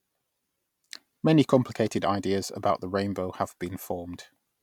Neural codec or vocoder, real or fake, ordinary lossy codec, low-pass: none; real; none; 19.8 kHz